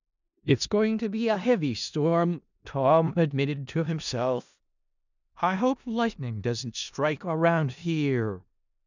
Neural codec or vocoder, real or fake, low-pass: codec, 16 kHz in and 24 kHz out, 0.4 kbps, LongCat-Audio-Codec, four codebook decoder; fake; 7.2 kHz